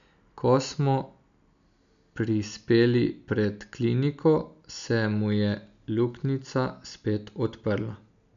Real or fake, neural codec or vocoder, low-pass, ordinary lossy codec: real; none; 7.2 kHz; none